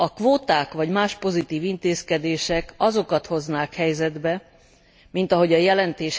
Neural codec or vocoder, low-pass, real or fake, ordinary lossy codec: none; none; real; none